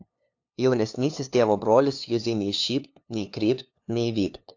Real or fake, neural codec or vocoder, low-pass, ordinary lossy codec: fake; codec, 16 kHz, 2 kbps, FunCodec, trained on LibriTTS, 25 frames a second; 7.2 kHz; AAC, 48 kbps